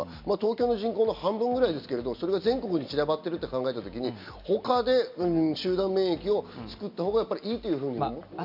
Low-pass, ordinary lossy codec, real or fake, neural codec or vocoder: 5.4 kHz; none; real; none